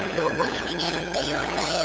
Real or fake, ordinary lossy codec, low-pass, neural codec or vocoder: fake; none; none; codec, 16 kHz, 8 kbps, FunCodec, trained on LibriTTS, 25 frames a second